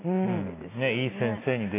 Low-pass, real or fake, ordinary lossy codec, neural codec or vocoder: 3.6 kHz; real; AAC, 24 kbps; none